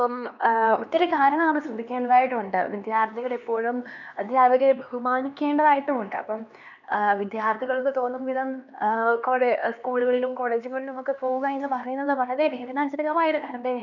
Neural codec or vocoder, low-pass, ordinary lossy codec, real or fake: codec, 16 kHz, 2 kbps, X-Codec, HuBERT features, trained on LibriSpeech; 7.2 kHz; none; fake